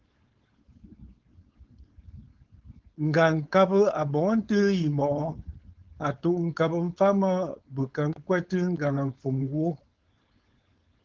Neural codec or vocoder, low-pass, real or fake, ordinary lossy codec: codec, 16 kHz, 4.8 kbps, FACodec; 7.2 kHz; fake; Opus, 16 kbps